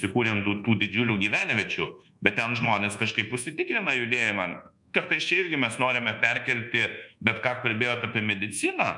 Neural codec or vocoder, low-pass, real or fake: codec, 24 kHz, 1.2 kbps, DualCodec; 10.8 kHz; fake